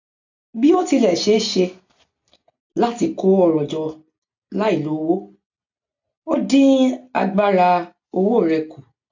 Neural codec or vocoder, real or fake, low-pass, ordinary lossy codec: none; real; 7.2 kHz; AAC, 48 kbps